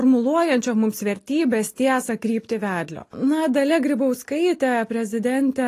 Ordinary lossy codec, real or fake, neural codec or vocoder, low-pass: AAC, 48 kbps; real; none; 14.4 kHz